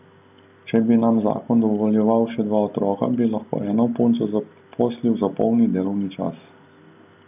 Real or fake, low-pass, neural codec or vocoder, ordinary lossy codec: real; 3.6 kHz; none; AAC, 32 kbps